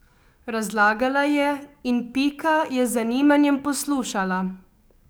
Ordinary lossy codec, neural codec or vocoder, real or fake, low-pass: none; codec, 44.1 kHz, 7.8 kbps, DAC; fake; none